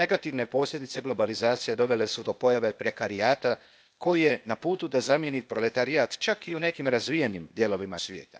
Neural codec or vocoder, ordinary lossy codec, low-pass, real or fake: codec, 16 kHz, 0.8 kbps, ZipCodec; none; none; fake